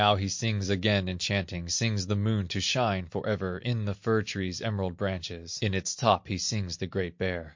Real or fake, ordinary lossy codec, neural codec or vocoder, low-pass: real; MP3, 48 kbps; none; 7.2 kHz